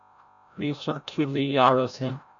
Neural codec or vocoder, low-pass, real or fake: codec, 16 kHz, 0.5 kbps, FreqCodec, larger model; 7.2 kHz; fake